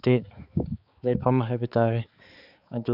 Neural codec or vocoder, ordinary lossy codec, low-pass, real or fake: codec, 16 kHz, 4 kbps, X-Codec, HuBERT features, trained on balanced general audio; none; 5.4 kHz; fake